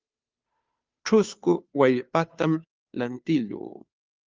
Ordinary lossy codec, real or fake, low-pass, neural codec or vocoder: Opus, 24 kbps; fake; 7.2 kHz; codec, 16 kHz, 2 kbps, FunCodec, trained on Chinese and English, 25 frames a second